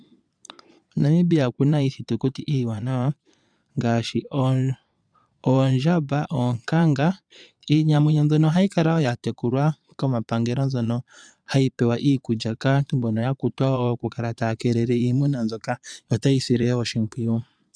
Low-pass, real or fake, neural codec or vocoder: 9.9 kHz; fake; vocoder, 22.05 kHz, 80 mel bands, Vocos